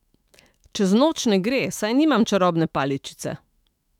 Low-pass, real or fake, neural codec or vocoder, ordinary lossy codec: 19.8 kHz; fake; autoencoder, 48 kHz, 128 numbers a frame, DAC-VAE, trained on Japanese speech; none